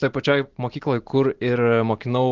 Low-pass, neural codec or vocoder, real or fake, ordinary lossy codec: 7.2 kHz; none; real; Opus, 16 kbps